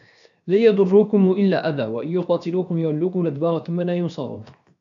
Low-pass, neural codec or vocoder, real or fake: 7.2 kHz; codec, 16 kHz, 0.7 kbps, FocalCodec; fake